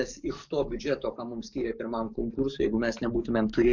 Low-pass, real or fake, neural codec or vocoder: 7.2 kHz; fake; codec, 44.1 kHz, 7.8 kbps, DAC